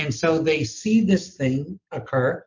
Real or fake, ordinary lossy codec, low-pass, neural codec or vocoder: real; MP3, 48 kbps; 7.2 kHz; none